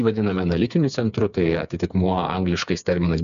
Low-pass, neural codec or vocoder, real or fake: 7.2 kHz; codec, 16 kHz, 4 kbps, FreqCodec, smaller model; fake